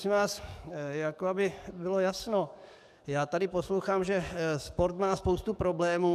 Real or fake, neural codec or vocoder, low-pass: fake; codec, 44.1 kHz, 7.8 kbps, DAC; 14.4 kHz